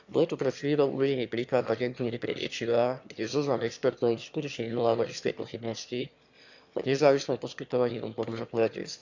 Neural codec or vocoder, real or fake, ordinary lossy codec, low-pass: autoencoder, 22.05 kHz, a latent of 192 numbers a frame, VITS, trained on one speaker; fake; none; 7.2 kHz